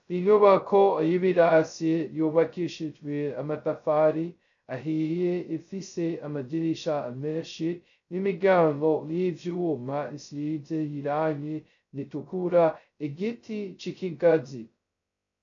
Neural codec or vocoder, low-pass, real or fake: codec, 16 kHz, 0.2 kbps, FocalCodec; 7.2 kHz; fake